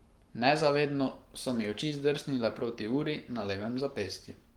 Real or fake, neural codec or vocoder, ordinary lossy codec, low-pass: fake; codec, 44.1 kHz, 7.8 kbps, Pupu-Codec; Opus, 24 kbps; 19.8 kHz